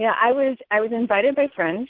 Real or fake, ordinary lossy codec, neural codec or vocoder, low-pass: real; Opus, 16 kbps; none; 5.4 kHz